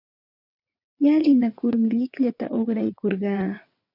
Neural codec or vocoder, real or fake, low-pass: vocoder, 22.05 kHz, 80 mel bands, WaveNeXt; fake; 5.4 kHz